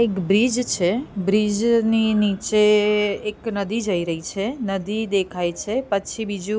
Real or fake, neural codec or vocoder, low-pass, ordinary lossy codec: real; none; none; none